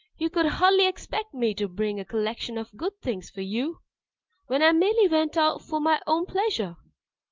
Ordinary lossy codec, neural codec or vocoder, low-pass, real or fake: Opus, 32 kbps; none; 7.2 kHz; real